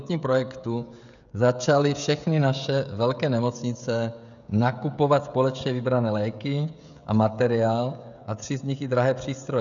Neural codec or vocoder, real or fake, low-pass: codec, 16 kHz, 16 kbps, FreqCodec, smaller model; fake; 7.2 kHz